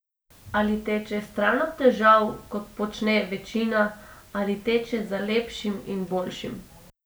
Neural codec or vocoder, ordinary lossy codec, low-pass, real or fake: none; none; none; real